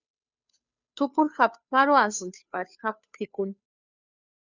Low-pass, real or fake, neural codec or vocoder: 7.2 kHz; fake; codec, 16 kHz, 2 kbps, FunCodec, trained on Chinese and English, 25 frames a second